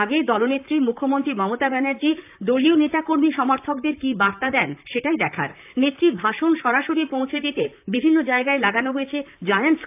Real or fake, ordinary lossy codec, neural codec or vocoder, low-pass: fake; AAC, 32 kbps; vocoder, 44.1 kHz, 128 mel bands, Pupu-Vocoder; 3.6 kHz